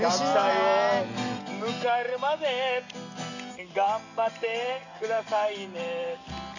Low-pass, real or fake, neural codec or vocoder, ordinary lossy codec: 7.2 kHz; real; none; none